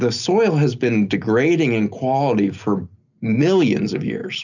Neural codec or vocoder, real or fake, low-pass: none; real; 7.2 kHz